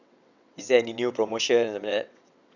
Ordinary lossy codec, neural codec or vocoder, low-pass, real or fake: none; vocoder, 22.05 kHz, 80 mel bands, WaveNeXt; 7.2 kHz; fake